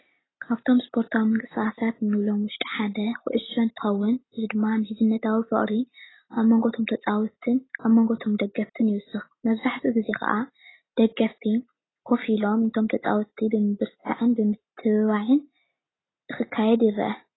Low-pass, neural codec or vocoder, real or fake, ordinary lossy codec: 7.2 kHz; none; real; AAC, 16 kbps